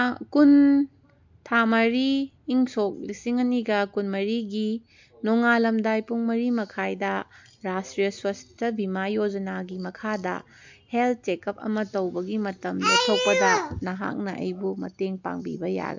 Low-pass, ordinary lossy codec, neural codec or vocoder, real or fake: 7.2 kHz; MP3, 64 kbps; none; real